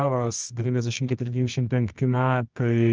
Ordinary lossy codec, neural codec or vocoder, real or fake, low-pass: Opus, 24 kbps; codec, 24 kHz, 0.9 kbps, WavTokenizer, medium music audio release; fake; 7.2 kHz